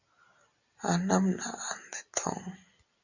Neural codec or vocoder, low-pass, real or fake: none; 7.2 kHz; real